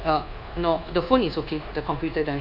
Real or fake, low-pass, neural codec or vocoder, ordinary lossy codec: fake; 5.4 kHz; codec, 24 kHz, 1.2 kbps, DualCodec; none